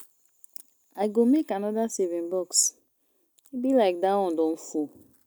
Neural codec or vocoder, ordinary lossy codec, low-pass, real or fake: none; none; none; real